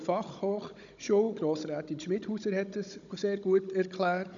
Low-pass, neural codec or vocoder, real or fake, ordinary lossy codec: 7.2 kHz; codec, 16 kHz, 16 kbps, FunCodec, trained on Chinese and English, 50 frames a second; fake; none